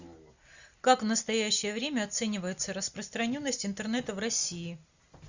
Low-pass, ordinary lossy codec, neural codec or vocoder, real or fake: 7.2 kHz; Opus, 64 kbps; none; real